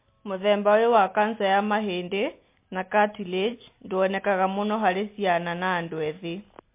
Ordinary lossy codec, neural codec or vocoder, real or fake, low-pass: MP3, 24 kbps; none; real; 3.6 kHz